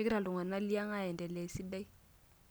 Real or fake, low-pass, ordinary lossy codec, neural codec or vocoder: real; none; none; none